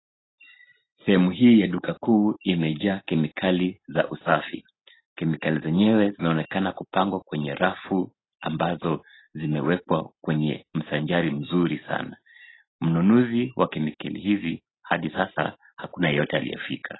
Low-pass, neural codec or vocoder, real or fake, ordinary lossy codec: 7.2 kHz; none; real; AAC, 16 kbps